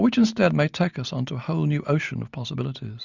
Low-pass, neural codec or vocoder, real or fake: 7.2 kHz; none; real